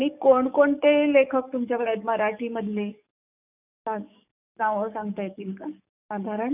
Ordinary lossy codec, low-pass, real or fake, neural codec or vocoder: none; 3.6 kHz; fake; codec, 44.1 kHz, 7.8 kbps, DAC